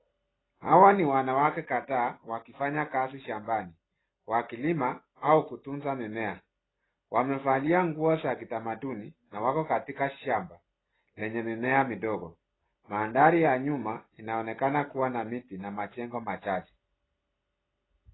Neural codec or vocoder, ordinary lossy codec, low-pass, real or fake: none; AAC, 16 kbps; 7.2 kHz; real